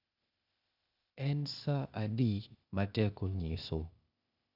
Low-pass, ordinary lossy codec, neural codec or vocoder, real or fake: 5.4 kHz; none; codec, 16 kHz, 0.8 kbps, ZipCodec; fake